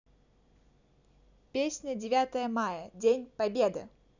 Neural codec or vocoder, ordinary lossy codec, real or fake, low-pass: none; none; real; 7.2 kHz